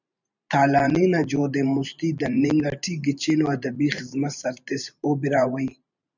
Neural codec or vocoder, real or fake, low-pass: vocoder, 44.1 kHz, 128 mel bands every 512 samples, BigVGAN v2; fake; 7.2 kHz